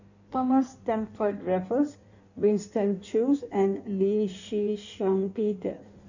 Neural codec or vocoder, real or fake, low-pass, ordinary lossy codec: codec, 16 kHz in and 24 kHz out, 1.1 kbps, FireRedTTS-2 codec; fake; 7.2 kHz; none